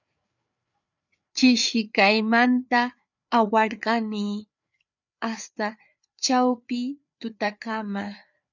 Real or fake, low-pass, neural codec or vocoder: fake; 7.2 kHz; codec, 16 kHz, 4 kbps, FreqCodec, larger model